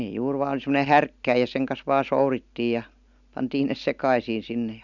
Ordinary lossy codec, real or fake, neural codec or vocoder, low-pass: none; real; none; 7.2 kHz